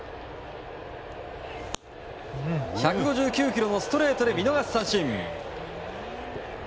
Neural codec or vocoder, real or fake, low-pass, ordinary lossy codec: none; real; none; none